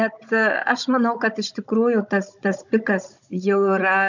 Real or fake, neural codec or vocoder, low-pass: fake; codec, 16 kHz, 16 kbps, FunCodec, trained on Chinese and English, 50 frames a second; 7.2 kHz